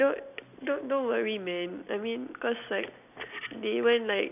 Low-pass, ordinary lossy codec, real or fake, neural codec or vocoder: 3.6 kHz; none; real; none